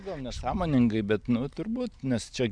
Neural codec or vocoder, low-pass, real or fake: none; 9.9 kHz; real